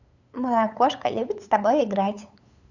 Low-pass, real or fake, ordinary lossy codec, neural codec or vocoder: 7.2 kHz; fake; none; codec, 16 kHz, 8 kbps, FunCodec, trained on LibriTTS, 25 frames a second